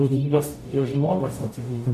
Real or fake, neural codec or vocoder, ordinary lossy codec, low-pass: fake; codec, 44.1 kHz, 0.9 kbps, DAC; MP3, 96 kbps; 14.4 kHz